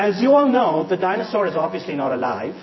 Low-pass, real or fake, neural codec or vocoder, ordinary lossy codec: 7.2 kHz; fake; vocoder, 24 kHz, 100 mel bands, Vocos; MP3, 24 kbps